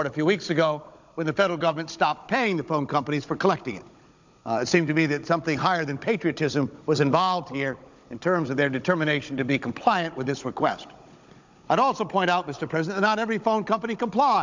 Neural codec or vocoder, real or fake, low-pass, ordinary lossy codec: codec, 16 kHz, 16 kbps, FunCodec, trained on Chinese and English, 50 frames a second; fake; 7.2 kHz; MP3, 64 kbps